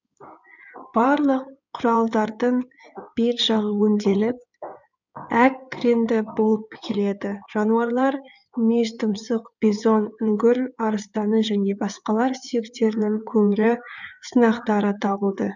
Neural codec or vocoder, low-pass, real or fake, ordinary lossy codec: codec, 16 kHz in and 24 kHz out, 2.2 kbps, FireRedTTS-2 codec; 7.2 kHz; fake; none